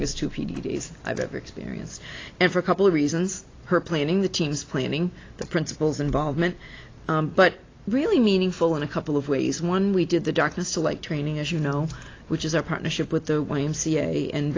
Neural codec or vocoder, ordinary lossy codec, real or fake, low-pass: none; AAC, 32 kbps; real; 7.2 kHz